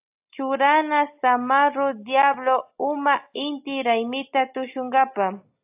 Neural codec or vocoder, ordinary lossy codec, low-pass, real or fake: none; AAC, 32 kbps; 3.6 kHz; real